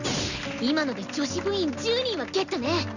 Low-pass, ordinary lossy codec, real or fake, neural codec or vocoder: 7.2 kHz; none; real; none